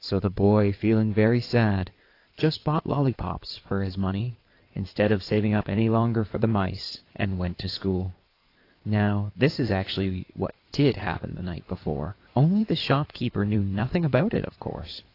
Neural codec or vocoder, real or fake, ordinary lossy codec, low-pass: codec, 16 kHz in and 24 kHz out, 2.2 kbps, FireRedTTS-2 codec; fake; AAC, 32 kbps; 5.4 kHz